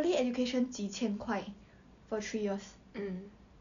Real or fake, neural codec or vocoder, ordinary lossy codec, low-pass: real; none; none; 7.2 kHz